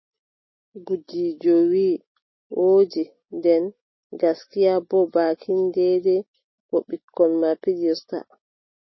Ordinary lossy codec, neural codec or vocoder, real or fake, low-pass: MP3, 24 kbps; none; real; 7.2 kHz